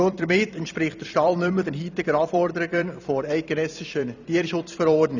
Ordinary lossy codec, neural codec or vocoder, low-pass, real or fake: none; vocoder, 44.1 kHz, 128 mel bands every 256 samples, BigVGAN v2; 7.2 kHz; fake